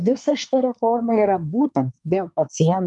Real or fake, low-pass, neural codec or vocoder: fake; 10.8 kHz; codec, 24 kHz, 1 kbps, SNAC